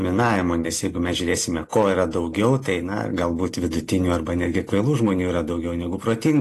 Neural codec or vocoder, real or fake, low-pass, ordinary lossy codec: none; real; 14.4 kHz; AAC, 48 kbps